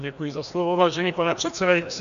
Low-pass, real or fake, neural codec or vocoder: 7.2 kHz; fake; codec, 16 kHz, 1 kbps, FreqCodec, larger model